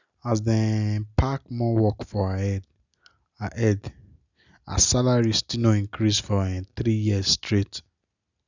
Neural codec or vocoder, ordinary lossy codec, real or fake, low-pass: none; none; real; 7.2 kHz